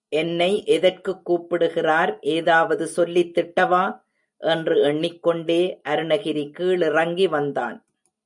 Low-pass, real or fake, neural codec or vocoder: 10.8 kHz; real; none